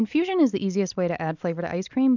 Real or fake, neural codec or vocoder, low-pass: real; none; 7.2 kHz